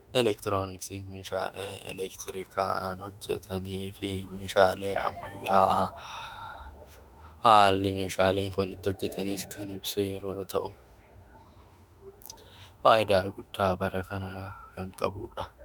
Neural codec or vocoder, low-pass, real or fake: autoencoder, 48 kHz, 32 numbers a frame, DAC-VAE, trained on Japanese speech; 19.8 kHz; fake